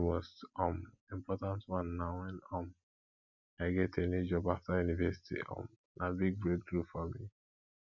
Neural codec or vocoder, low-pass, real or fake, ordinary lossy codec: none; 7.2 kHz; real; none